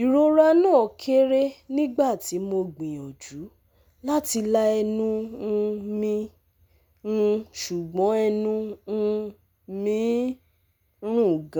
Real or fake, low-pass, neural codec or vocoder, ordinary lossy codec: real; none; none; none